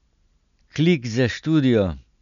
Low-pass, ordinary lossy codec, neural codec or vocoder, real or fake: 7.2 kHz; none; none; real